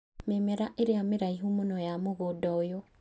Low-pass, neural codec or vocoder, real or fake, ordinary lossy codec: none; none; real; none